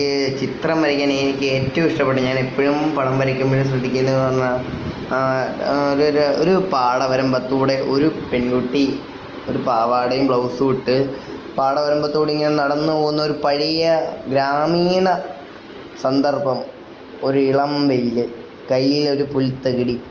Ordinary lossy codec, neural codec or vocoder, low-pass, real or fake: Opus, 24 kbps; none; 7.2 kHz; real